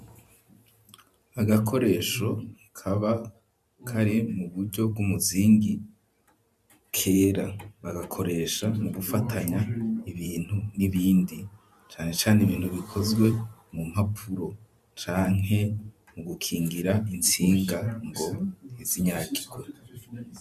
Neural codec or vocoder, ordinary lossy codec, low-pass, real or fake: vocoder, 44.1 kHz, 128 mel bands every 512 samples, BigVGAN v2; MP3, 96 kbps; 14.4 kHz; fake